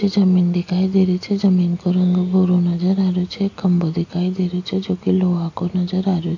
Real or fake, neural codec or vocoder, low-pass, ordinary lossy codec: real; none; 7.2 kHz; AAC, 48 kbps